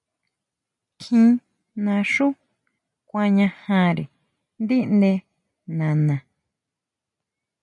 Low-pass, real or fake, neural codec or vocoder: 10.8 kHz; real; none